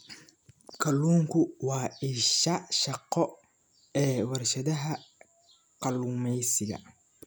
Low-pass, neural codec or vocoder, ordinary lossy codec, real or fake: none; none; none; real